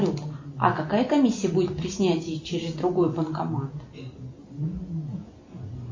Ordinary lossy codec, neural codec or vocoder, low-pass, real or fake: MP3, 32 kbps; none; 7.2 kHz; real